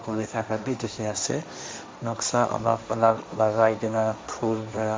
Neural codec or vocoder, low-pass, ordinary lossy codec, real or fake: codec, 16 kHz, 1.1 kbps, Voila-Tokenizer; none; none; fake